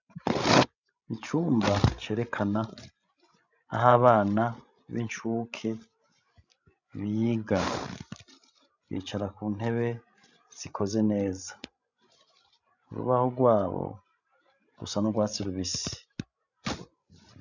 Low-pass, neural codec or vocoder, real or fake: 7.2 kHz; codec, 16 kHz, 16 kbps, FreqCodec, larger model; fake